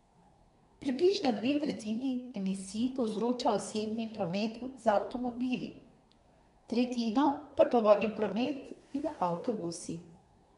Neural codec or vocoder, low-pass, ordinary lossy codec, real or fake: codec, 24 kHz, 1 kbps, SNAC; 10.8 kHz; none; fake